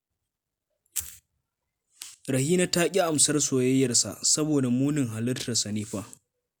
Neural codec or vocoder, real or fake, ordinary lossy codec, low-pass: none; real; none; none